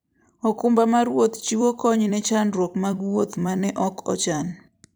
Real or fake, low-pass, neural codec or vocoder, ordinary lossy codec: fake; none; vocoder, 44.1 kHz, 128 mel bands every 256 samples, BigVGAN v2; none